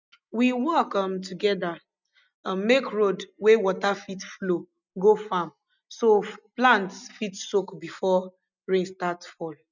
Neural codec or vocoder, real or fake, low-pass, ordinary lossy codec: none; real; 7.2 kHz; none